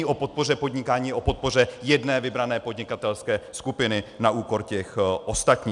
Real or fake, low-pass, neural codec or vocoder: real; 10.8 kHz; none